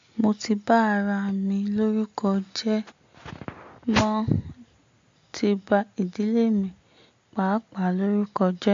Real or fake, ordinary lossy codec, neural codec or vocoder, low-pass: real; AAC, 48 kbps; none; 7.2 kHz